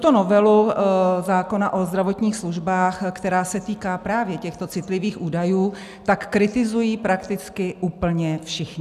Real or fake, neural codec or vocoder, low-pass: real; none; 14.4 kHz